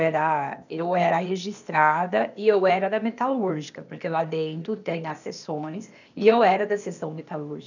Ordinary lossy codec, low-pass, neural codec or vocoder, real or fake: none; 7.2 kHz; codec, 16 kHz, 0.8 kbps, ZipCodec; fake